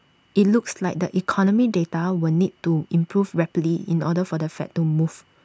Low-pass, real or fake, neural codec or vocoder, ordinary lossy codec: none; real; none; none